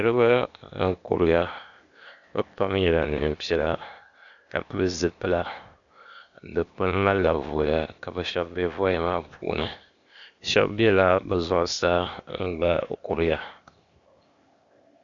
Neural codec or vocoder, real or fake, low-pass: codec, 16 kHz, 0.8 kbps, ZipCodec; fake; 7.2 kHz